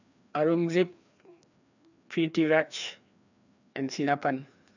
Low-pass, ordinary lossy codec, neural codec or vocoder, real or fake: 7.2 kHz; none; codec, 16 kHz, 2 kbps, FreqCodec, larger model; fake